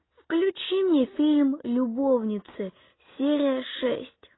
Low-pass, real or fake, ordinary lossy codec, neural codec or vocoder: 7.2 kHz; real; AAC, 16 kbps; none